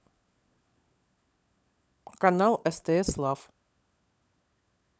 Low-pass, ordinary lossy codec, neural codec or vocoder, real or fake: none; none; codec, 16 kHz, 16 kbps, FunCodec, trained on LibriTTS, 50 frames a second; fake